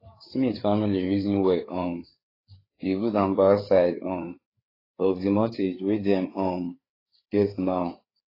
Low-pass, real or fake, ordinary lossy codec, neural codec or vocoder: 5.4 kHz; fake; AAC, 24 kbps; codec, 16 kHz, 4 kbps, FreqCodec, larger model